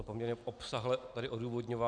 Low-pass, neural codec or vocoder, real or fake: 9.9 kHz; none; real